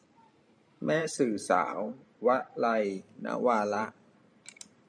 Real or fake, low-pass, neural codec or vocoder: fake; 9.9 kHz; vocoder, 44.1 kHz, 128 mel bands every 512 samples, BigVGAN v2